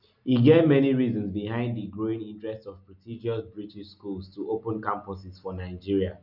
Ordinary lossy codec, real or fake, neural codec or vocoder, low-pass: none; real; none; 5.4 kHz